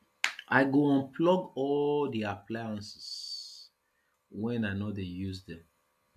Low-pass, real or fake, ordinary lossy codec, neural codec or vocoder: 14.4 kHz; real; none; none